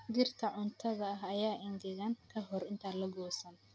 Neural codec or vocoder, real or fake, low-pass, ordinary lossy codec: none; real; none; none